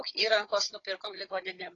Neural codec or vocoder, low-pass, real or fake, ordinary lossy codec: codec, 16 kHz, 16 kbps, FunCodec, trained on Chinese and English, 50 frames a second; 7.2 kHz; fake; AAC, 32 kbps